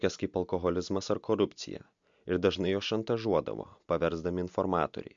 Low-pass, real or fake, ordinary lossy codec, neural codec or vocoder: 7.2 kHz; real; MP3, 64 kbps; none